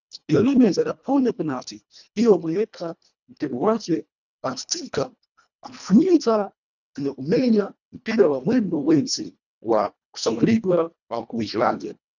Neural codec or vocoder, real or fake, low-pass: codec, 24 kHz, 1.5 kbps, HILCodec; fake; 7.2 kHz